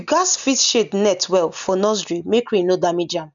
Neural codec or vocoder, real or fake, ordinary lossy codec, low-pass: none; real; none; 7.2 kHz